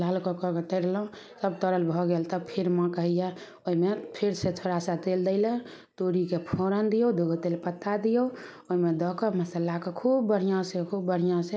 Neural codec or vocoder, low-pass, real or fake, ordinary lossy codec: none; none; real; none